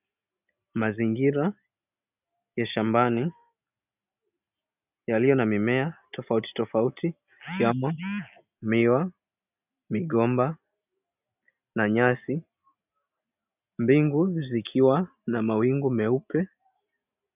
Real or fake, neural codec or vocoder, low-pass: real; none; 3.6 kHz